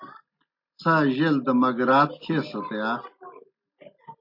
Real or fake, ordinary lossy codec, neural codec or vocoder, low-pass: real; MP3, 32 kbps; none; 5.4 kHz